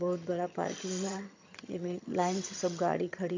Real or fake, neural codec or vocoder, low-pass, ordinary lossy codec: fake; vocoder, 22.05 kHz, 80 mel bands, HiFi-GAN; 7.2 kHz; MP3, 48 kbps